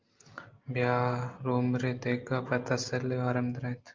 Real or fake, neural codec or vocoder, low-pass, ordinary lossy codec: real; none; 7.2 kHz; Opus, 24 kbps